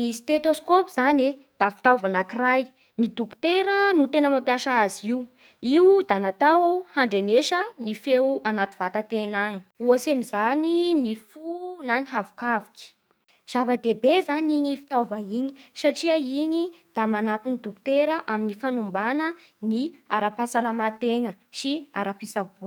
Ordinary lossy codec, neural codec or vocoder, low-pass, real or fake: none; codec, 44.1 kHz, 2.6 kbps, SNAC; none; fake